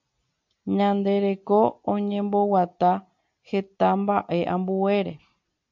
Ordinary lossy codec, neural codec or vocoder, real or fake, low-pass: MP3, 64 kbps; none; real; 7.2 kHz